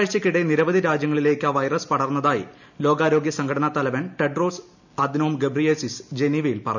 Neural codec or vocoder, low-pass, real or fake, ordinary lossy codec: none; 7.2 kHz; real; none